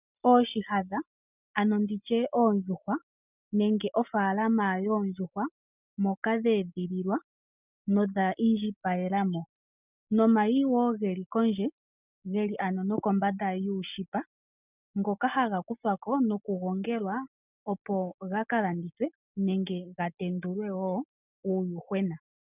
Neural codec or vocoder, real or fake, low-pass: none; real; 3.6 kHz